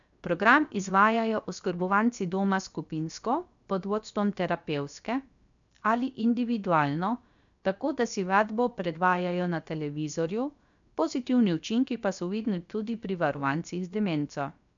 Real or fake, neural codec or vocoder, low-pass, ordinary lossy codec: fake; codec, 16 kHz, about 1 kbps, DyCAST, with the encoder's durations; 7.2 kHz; MP3, 96 kbps